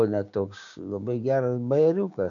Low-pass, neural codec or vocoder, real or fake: 7.2 kHz; codec, 16 kHz, 6 kbps, DAC; fake